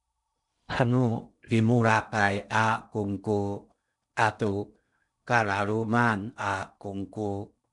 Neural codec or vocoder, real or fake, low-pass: codec, 16 kHz in and 24 kHz out, 0.8 kbps, FocalCodec, streaming, 65536 codes; fake; 10.8 kHz